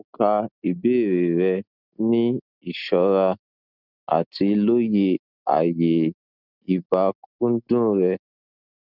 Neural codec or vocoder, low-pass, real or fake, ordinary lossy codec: none; 5.4 kHz; real; none